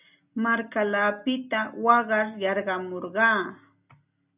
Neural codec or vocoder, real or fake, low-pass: none; real; 3.6 kHz